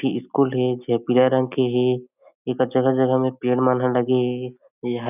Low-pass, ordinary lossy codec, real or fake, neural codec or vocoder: 3.6 kHz; none; real; none